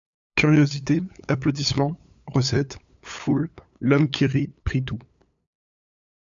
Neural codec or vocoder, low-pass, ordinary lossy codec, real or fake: codec, 16 kHz, 8 kbps, FunCodec, trained on LibriTTS, 25 frames a second; 7.2 kHz; AAC, 64 kbps; fake